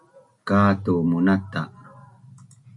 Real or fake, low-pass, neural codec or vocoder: real; 10.8 kHz; none